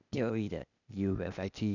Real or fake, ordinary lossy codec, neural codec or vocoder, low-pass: fake; none; codec, 16 kHz, 0.8 kbps, ZipCodec; 7.2 kHz